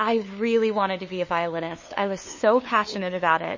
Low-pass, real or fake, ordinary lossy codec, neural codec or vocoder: 7.2 kHz; fake; MP3, 32 kbps; codec, 16 kHz, 2 kbps, FunCodec, trained on LibriTTS, 25 frames a second